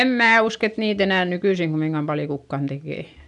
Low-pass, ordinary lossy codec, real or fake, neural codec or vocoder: 10.8 kHz; none; real; none